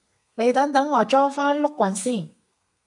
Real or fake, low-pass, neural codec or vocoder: fake; 10.8 kHz; codec, 32 kHz, 1.9 kbps, SNAC